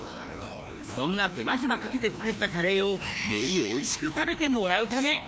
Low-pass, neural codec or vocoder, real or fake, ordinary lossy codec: none; codec, 16 kHz, 1 kbps, FreqCodec, larger model; fake; none